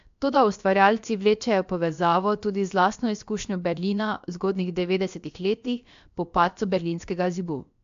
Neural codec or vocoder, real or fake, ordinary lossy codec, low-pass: codec, 16 kHz, about 1 kbps, DyCAST, with the encoder's durations; fake; AAC, 64 kbps; 7.2 kHz